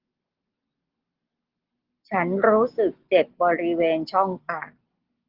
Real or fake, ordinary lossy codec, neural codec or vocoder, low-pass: real; Opus, 16 kbps; none; 5.4 kHz